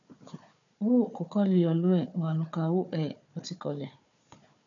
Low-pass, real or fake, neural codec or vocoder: 7.2 kHz; fake; codec, 16 kHz, 4 kbps, FunCodec, trained on Chinese and English, 50 frames a second